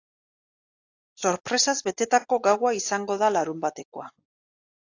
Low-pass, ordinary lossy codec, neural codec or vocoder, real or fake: 7.2 kHz; AAC, 48 kbps; none; real